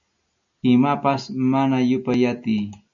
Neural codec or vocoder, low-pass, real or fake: none; 7.2 kHz; real